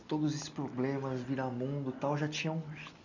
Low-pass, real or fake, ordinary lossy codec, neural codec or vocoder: 7.2 kHz; real; none; none